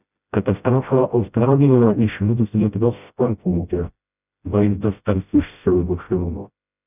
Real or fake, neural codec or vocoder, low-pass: fake; codec, 16 kHz, 0.5 kbps, FreqCodec, smaller model; 3.6 kHz